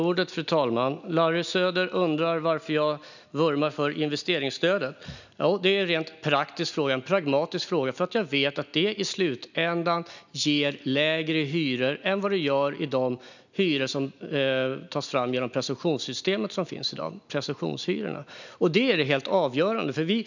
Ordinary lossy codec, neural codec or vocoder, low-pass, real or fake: none; none; 7.2 kHz; real